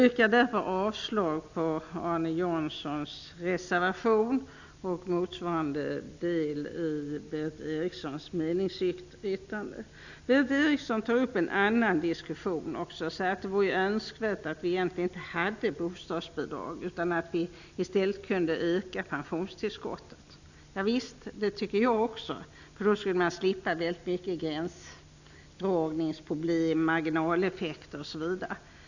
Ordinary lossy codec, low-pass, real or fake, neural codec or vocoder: none; 7.2 kHz; real; none